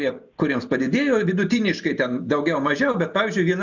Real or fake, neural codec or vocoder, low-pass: real; none; 7.2 kHz